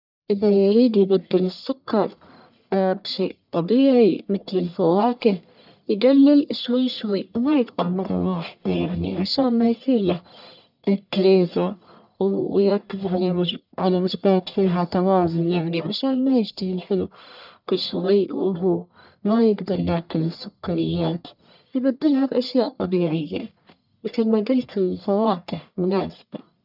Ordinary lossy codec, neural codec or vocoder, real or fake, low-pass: none; codec, 44.1 kHz, 1.7 kbps, Pupu-Codec; fake; 5.4 kHz